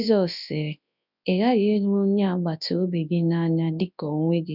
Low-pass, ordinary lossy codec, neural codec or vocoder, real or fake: 5.4 kHz; none; codec, 24 kHz, 0.9 kbps, WavTokenizer, large speech release; fake